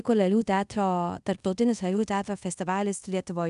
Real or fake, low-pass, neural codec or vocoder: fake; 10.8 kHz; codec, 24 kHz, 0.5 kbps, DualCodec